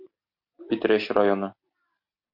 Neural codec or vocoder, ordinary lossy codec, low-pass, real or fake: none; MP3, 48 kbps; 5.4 kHz; real